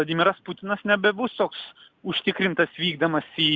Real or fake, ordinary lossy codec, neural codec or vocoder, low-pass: real; Opus, 64 kbps; none; 7.2 kHz